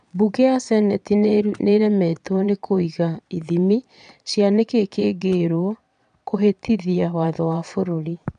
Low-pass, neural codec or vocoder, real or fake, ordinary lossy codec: 9.9 kHz; vocoder, 22.05 kHz, 80 mel bands, Vocos; fake; none